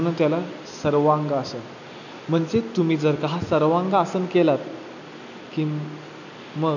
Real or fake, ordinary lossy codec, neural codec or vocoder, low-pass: real; none; none; 7.2 kHz